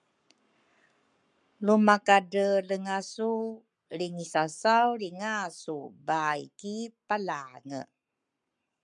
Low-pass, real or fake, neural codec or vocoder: 10.8 kHz; fake; codec, 44.1 kHz, 7.8 kbps, Pupu-Codec